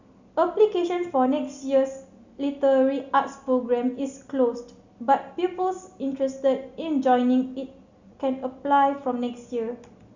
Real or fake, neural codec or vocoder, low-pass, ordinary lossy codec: real; none; 7.2 kHz; Opus, 64 kbps